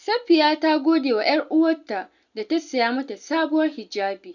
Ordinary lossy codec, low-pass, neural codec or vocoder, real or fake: none; 7.2 kHz; none; real